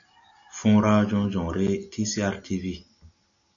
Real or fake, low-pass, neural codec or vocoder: real; 7.2 kHz; none